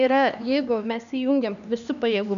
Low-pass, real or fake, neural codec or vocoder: 7.2 kHz; fake; codec, 16 kHz, 2 kbps, X-Codec, HuBERT features, trained on LibriSpeech